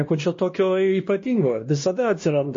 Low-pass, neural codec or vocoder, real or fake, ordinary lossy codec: 7.2 kHz; codec, 16 kHz, 1 kbps, X-Codec, WavLM features, trained on Multilingual LibriSpeech; fake; MP3, 32 kbps